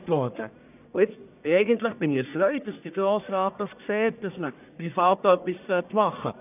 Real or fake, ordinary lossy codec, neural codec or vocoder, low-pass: fake; none; codec, 44.1 kHz, 1.7 kbps, Pupu-Codec; 3.6 kHz